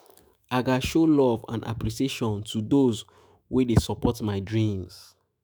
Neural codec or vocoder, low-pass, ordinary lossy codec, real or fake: autoencoder, 48 kHz, 128 numbers a frame, DAC-VAE, trained on Japanese speech; none; none; fake